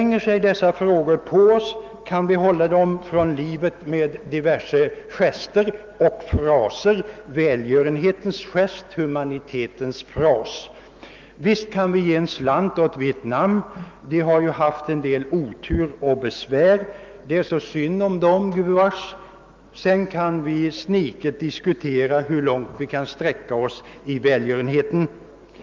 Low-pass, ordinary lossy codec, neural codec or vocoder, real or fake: 7.2 kHz; Opus, 32 kbps; autoencoder, 48 kHz, 128 numbers a frame, DAC-VAE, trained on Japanese speech; fake